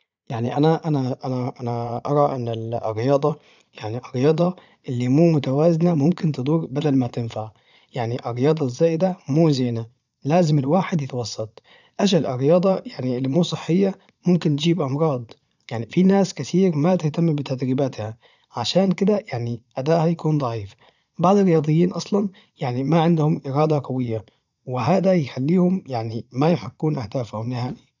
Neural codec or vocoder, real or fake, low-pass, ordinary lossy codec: vocoder, 22.05 kHz, 80 mel bands, Vocos; fake; 7.2 kHz; none